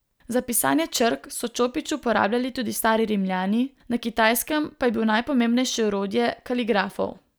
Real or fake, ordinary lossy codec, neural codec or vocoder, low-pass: real; none; none; none